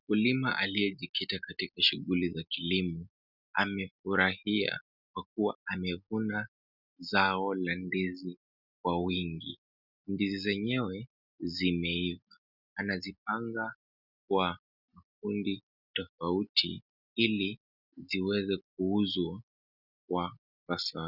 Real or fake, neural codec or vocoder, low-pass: real; none; 5.4 kHz